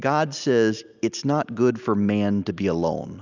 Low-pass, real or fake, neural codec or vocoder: 7.2 kHz; real; none